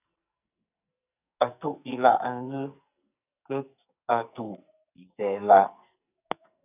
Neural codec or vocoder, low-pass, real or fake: codec, 44.1 kHz, 2.6 kbps, SNAC; 3.6 kHz; fake